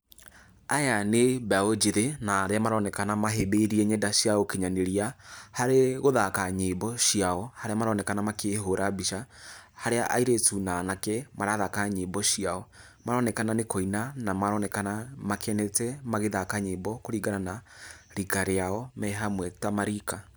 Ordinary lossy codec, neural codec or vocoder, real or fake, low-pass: none; none; real; none